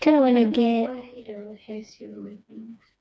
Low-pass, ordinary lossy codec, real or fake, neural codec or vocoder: none; none; fake; codec, 16 kHz, 2 kbps, FreqCodec, smaller model